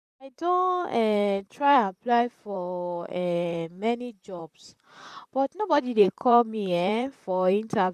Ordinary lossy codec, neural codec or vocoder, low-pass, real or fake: none; vocoder, 44.1 kHz, 128 mel bands, Pupu-Vocoder; 14.4 kHz; fake